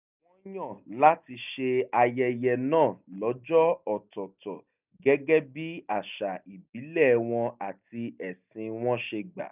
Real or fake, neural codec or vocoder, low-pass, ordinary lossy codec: real; none; 3.6 kHz; none